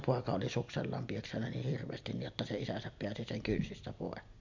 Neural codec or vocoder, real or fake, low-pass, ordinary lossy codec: none; real; 7.2 kHz; MP3, 64 kbps